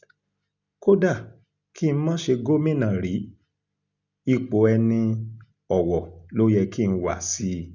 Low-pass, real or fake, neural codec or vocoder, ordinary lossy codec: 7.2 kHz; real; none; none